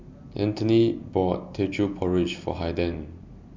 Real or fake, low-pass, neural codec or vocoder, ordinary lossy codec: real; 7.2 kHz; none; MP3, 64 kbps